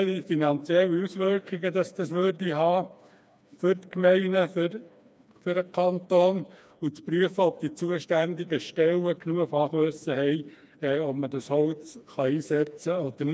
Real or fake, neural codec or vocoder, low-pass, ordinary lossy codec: fake; codec, 16 kHz, 2 kbps, FreqCodec, smaller model; none; none